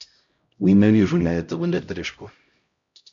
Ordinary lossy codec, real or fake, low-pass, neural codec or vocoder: MP3, 48 kbps; fake; 7.2 kHz; codec, 16 kHz, 0.5 kbps, X-Codec, HuBERT features, trained on LibriSpeech